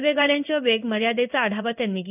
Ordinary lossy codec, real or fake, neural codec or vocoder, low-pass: none; fake; codec, 16 kHz in and 24 kHz out, 1 kbps, XY-Tokenizer; 3.6 kHz